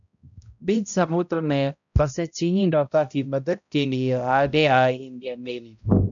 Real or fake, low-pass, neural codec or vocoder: fake; 7.2 kHz; codec, 16 kHz, 0.5 kbps, X-Codec, HuBERT features, trained on balanced general audio